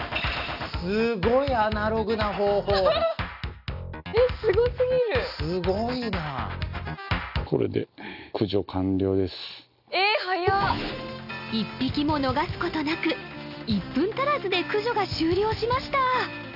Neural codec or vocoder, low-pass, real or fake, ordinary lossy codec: none; 5.4 kHz; real; none